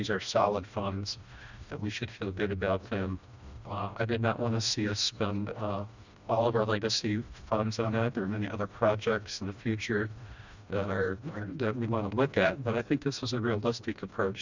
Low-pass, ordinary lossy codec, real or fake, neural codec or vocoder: 7.2 kHz; Opus, 64 kbps; fake; codec, 16 kHz, 1 kbps, FreqCodec, smaller model